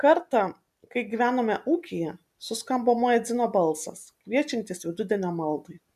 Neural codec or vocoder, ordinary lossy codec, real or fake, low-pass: none; MP3, 96 kbps; real; 14.4 kHz